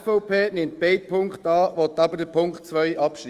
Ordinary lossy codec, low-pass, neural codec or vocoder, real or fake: Opus, 32 kbps; 14.4 kHz; autoencoder, 48 kHz, 128 numbers a frame, DAC-VAE, trained on Japanese speech; fake